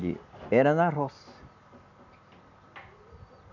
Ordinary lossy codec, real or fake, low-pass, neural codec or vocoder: none; real; 7.2 kHz; none